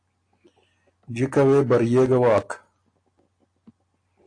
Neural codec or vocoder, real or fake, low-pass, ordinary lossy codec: none; real; 9.9 kHz; AAC, 48 kbps